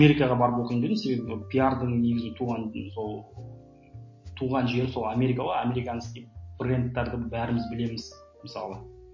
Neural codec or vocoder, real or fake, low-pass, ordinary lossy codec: none; real; 7.2 kHz; MP3, 32 kbps